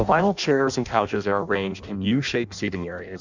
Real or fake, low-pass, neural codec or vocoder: fake; 7.2 kHz; codec, 16 kHz in and 24 kHz out, 0.6 kbps, FireRedTTS-2 codec